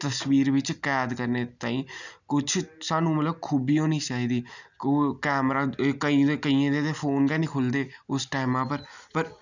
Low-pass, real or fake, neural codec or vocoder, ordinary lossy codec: 7.2 kHz; real; none; none